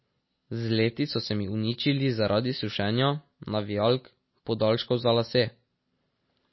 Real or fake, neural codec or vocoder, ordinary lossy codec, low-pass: real; none; MP3, 24 kbps; 7.2 kHz